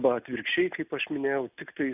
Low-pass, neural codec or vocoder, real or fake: 3.6 kHz; none; real